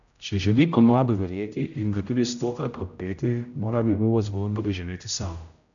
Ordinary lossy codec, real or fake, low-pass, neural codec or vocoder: none; fake; 7.2 kHz; codec, 16 kHz, 0.5 kbps, X-Codec, HuBERT features, trained on general audio